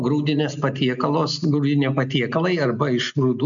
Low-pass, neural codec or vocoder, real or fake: 7.2 kHz; none; real